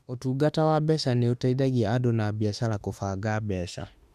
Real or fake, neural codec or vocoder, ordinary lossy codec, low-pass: fake; autoencoder, 48 kHz, 32 numbers a frame, DAC-VAE, trained on Japanese speech; Opus, 64 kbps; 14.4 kHz